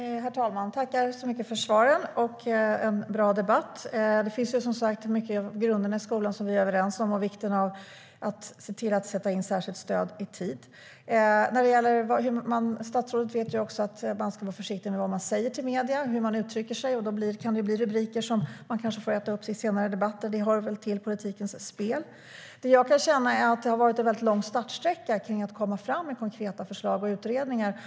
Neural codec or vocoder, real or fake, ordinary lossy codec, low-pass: none; real; none; none